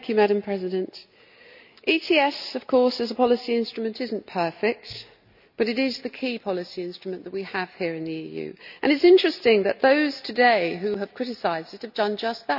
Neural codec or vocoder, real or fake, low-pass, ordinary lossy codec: none; real; 5.4 kHz; none